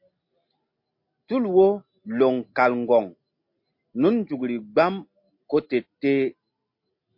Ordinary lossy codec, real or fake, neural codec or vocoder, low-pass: MP3, 32 kbps; real; none; 5.4 kHz